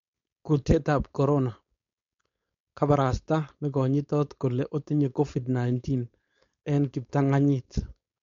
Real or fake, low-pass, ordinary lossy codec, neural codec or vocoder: fake; 7.2 kHz; MP3, 48 kbps; codec, 16 kHz, 4.8 kbps, FACodec